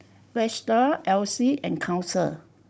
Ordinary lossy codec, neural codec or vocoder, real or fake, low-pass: none; codec, 16 kHz, 16 kbps, FunCodec, trained on Chinese and English, 50 frames a second; fake; none